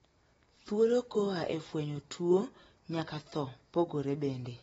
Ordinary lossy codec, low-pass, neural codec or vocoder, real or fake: AAC, 24 kbps; 19.8 kHz; none; real